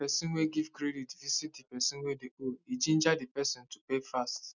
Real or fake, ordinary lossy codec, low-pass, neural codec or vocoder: real; none; 7.2 kHz; none